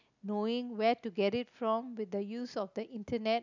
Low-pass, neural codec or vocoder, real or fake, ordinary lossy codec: 7.2 kHz; none; real; none